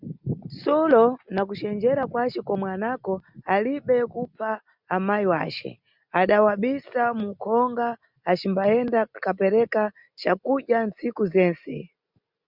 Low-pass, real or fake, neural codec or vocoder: 5.4 kHz; real; none